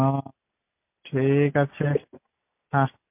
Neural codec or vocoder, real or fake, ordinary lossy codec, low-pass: none; real; MP3, 32 kbps; 3.6 kHz